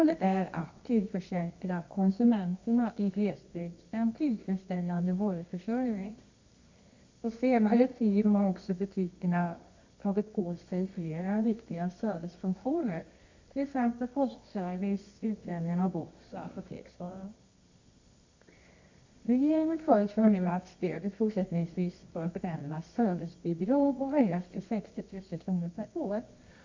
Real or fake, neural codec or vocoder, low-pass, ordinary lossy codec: fake; codec, 24 kHz, 0.9 kbps, WavTokenizer, medium music audio release; 7.2 kHz; MP3, 64 kbps